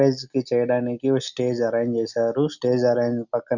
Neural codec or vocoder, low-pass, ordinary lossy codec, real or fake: none; 7.2 kHz; none; real